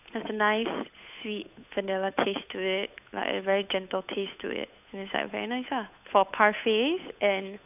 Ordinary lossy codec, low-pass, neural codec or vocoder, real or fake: none; 3.6 kHz; codec, 16 kHz, 8 kbps, FunCodec, trained on Chinese and English, 25 frames a second; fake